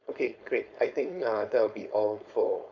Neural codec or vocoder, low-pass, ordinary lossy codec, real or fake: codec, 16 kHz, 4.8 kbps, FACodec; 7.2 kHz; none; fake